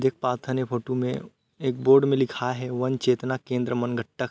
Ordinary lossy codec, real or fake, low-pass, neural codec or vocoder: none; real; none; none